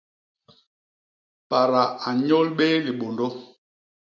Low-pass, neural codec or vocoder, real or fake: 7.2 kHz; none; real